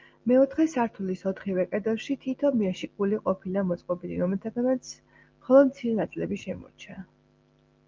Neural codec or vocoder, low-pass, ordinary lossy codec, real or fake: none; 7.2 kHz; Opus, 32 kbps; real